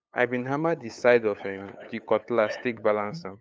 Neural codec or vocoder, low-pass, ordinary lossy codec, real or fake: codec, 16 kHz, 8 kbps, FunCodec, trained on LibriTTS, 25 frames a second; none; none; fake